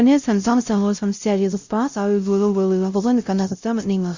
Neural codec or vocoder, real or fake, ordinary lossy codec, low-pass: codec, 16 kHz, 0.5 kbps, X-Codec, WavLM features, trained on Multilingual LibriSpeech; fake; Opus, 64 kbps; 7.2 kHz